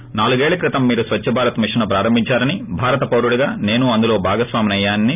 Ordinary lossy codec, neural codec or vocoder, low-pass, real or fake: none; none; 3.6 kHz; real